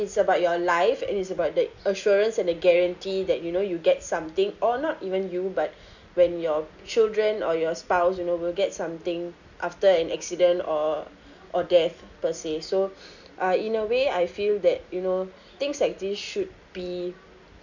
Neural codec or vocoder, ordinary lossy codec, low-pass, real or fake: none; none; 7.2 kHz; real